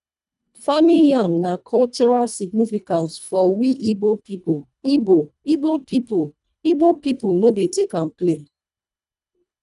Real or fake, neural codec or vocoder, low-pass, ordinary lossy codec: fake; codec, 24 kHz, 1.5 kbps, HILCodec; 10.8 kHz; none